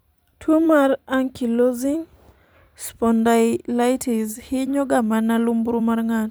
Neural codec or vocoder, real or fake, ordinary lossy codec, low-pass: vocoder, 44.1 kHz, 128 mel bands every 256 samples, BigVGAN v2; fake; none; none